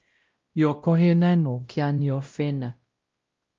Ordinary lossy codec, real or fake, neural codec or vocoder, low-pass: Opus, 32 kbps; fake; codec, 16 kHz, 0.5 kbps, X-Codec, WavLM features, trained on Multilingual LibriSpeech; 7.2 kHz